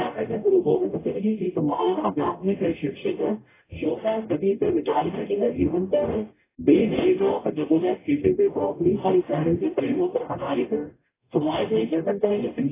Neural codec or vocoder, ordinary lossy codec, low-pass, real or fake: codec, 44.1 kHz, 0.9 kbps, DAC; AAC, 16 kbps; 3.6 kHz; fake